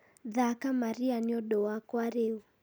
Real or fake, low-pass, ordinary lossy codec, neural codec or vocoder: real; none; none; none